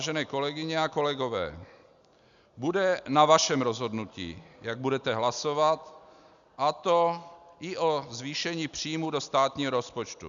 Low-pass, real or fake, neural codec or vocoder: 7.2 kHz; real; none